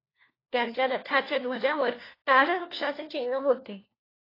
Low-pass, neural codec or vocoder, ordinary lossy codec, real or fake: 5.4 kHz; codec, 16 kHz, 1 kbps, FunCodec, trained on LibriTTS, 50 frames a second; AAC, 24 kbps; fake